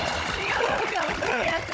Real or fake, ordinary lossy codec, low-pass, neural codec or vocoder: fake; none; none; codec, 16 kHz, 16 kbps, FunCodec, trained on Chinese and English, 50 frames a second